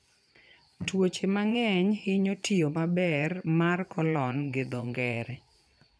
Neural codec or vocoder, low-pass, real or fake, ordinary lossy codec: vocoder, 22.05 kHz, 80 mel bands, Vocos; none; fake; none